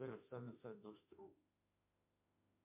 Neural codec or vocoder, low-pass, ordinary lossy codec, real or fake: autoencoder, 48 kHz, 32 numbers a frame, DAC-VAE, trained on Japanese speech; 3.6 kHz; MP3, 32 kbps; fake